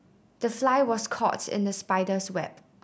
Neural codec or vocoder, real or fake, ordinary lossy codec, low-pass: none; real; none; none